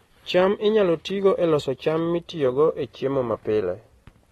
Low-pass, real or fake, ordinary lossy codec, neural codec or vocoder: 19.8 kHz; real; AAC, 32 kbps; none